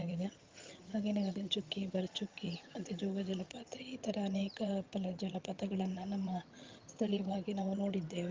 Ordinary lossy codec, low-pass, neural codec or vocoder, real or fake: Opus, 32 kbps; 7.2 kHz; vocoder, 22.05 kHz, 80 mel bands, HiFi-GAN; fake